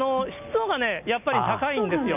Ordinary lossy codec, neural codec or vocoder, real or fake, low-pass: none; none; real; 3.6 kHz